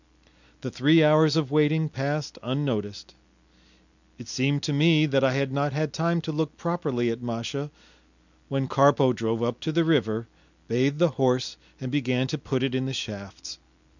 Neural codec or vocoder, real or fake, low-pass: none; real; 7.2 kHz